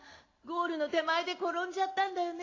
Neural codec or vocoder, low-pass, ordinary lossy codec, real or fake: none; 7.2 kHz; AAC, 32 kbps; real